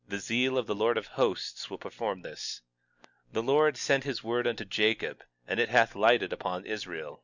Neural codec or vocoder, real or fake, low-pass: none; real; 7.2 kHz